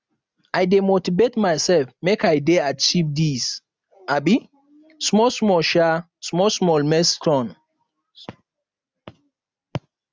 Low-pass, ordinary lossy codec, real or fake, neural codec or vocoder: none; none; real; none